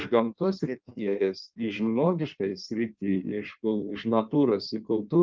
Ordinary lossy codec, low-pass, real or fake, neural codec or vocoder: Opus, 24 kbps; 7.2 kHz; fake; codec, 16 kHz in and 24 kHz out, 1.1 kbps, FireRedTTS-2 codec